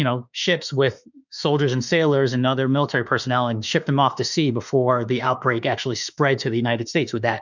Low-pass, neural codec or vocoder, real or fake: 7.2 kHz; autoencoder, 48 kHz, 32 numbers a frame, DAC-VAE, trained on Japanese speech; fake